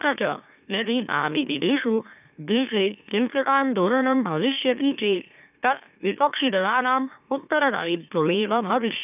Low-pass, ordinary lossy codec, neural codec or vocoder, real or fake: 3.6 kHz; none; autoencoder, 44.1 kHz, a latent of 192 numbers a frame, MeloTTS; fake